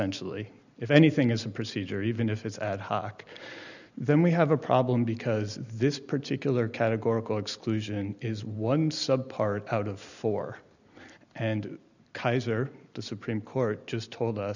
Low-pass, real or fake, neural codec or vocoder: 7.2 kHz; real; none